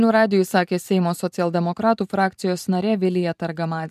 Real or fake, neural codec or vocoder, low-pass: fake; vocoder, 44.1 kHz, 128 mel bands every 512 samples, BigVGAN v2; 14.4 kHz